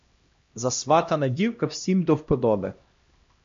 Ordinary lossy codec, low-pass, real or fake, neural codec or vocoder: MP3, 48 kbps; 7.2 kHz; fake; codec, 16 kHz, 1 kbps, X-Codec, HuBERT features, trained on LibriSpeech